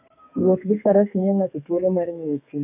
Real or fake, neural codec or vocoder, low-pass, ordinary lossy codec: fake; codec, 32 kHz, 1.9 kbps, SNAC; 3.6 kHz; none